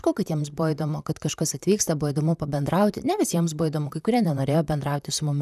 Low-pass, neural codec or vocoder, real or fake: 14.4 kHz; vocoder, 44.1 kHz, 128 mel bands, Pupu-Vocoder; fake